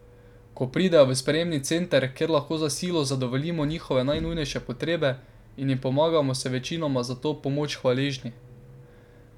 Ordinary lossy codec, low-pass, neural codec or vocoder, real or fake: none; 19.8 kHz; none; real